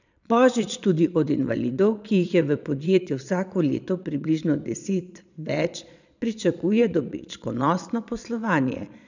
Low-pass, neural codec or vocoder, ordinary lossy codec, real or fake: 7.2 kHz; vocoder, 22.05 kHz, 80 mel bands, Vocos; none; fake